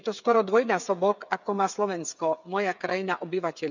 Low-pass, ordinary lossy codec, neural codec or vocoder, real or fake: 7.2 kHz; none; codec, 16 kHz, 8 kbps, FreqCodec, smaller model; fake